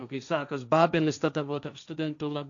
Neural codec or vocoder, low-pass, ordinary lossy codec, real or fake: codec, 16 kHz, 1.1 kbps, Voila-Tokenizer; 7.2 kHz; MP3, 96 kbps; fake